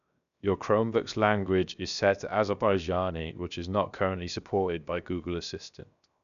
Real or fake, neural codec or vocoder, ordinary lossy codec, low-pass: fake; codec, 16 kHz, 0.7 kbps, FocalCodec; none; 7.2 kHz